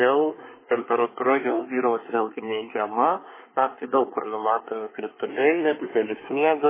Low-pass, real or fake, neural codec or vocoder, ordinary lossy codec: 3.6 kHz; fake; codec, 24 kHz, 1 kbps, SNAC; MP3, 16 kbps